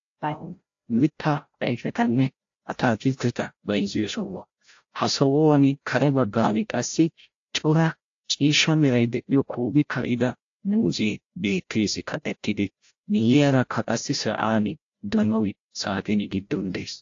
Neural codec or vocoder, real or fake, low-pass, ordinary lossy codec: codec, 16 kHz, 0.5 kbps, FreqCodec, larger model; fake; 7.2 kHz; AAC, 48 kbps